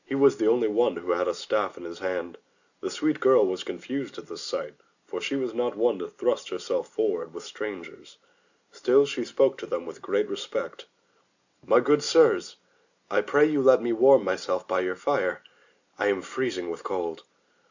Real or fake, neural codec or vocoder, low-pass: real; none; 7.2 kHz